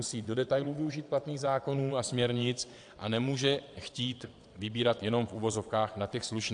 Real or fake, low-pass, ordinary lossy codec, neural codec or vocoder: fake; 9.9 kHz; AAC, 64 kbps; vocoder, 22.05 kHz, 80 mel bands, WaveNeXt